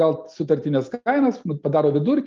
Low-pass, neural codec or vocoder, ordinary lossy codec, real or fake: 7.2 kHz; none; Opus, 24 kbps; real